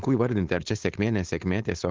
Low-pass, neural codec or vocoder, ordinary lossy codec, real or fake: 7.2 kHz; codec, 16 kHz, 4.8 kbps, FACodec; Opus, 16 kbps; fake